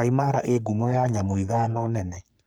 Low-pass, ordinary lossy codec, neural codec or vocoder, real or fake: none; none; codec, 44.1 kHz, 3.4 kbps, Pupu-Codec; fake